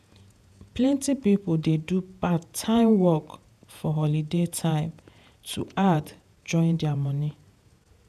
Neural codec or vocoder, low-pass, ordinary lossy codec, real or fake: vocoder, 48 kHz, 128 mel bands, Vocos; 14.4 kHz; none; fake